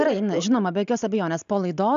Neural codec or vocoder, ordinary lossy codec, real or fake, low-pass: none; AAC, 96 kbps; real; 7.2 kHz